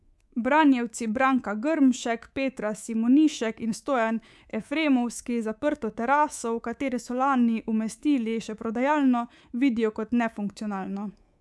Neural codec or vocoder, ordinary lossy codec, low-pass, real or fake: codec, 24 kHz, 3.1 kbps, DualCodec; none; none; fake